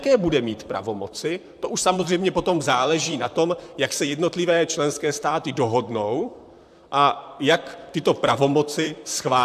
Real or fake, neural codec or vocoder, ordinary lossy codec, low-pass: fake; vocoder, 44.1 kHz, 128 mel bands, Pupu-Vocoder; AAC, 96 kbps; 14.4 kHz